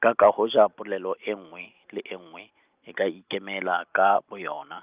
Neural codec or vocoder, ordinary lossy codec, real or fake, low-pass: none; Opus, 24 kbps; real; 3.6 kHz